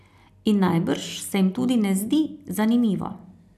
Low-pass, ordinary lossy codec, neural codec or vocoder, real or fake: 14.4 kHz; none; none; real